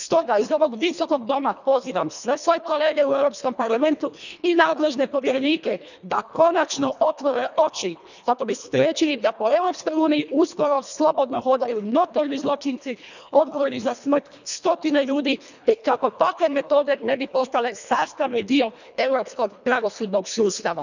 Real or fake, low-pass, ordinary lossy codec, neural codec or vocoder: fake; 7.2 kHz; none; codec, 24 kHz, 1.5 kbps, HILCodec